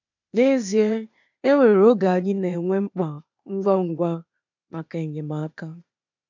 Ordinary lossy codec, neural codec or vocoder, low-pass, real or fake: none; codec, 16 kHz, 0.8 kbps, ZipCodec; 7.2 kHz; fake